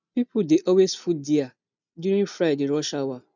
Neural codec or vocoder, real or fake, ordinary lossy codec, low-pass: none; real; none; 7.2 kHz